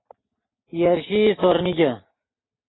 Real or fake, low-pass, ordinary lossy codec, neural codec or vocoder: real; 7.2 kHz; AAC, 16 kbps; none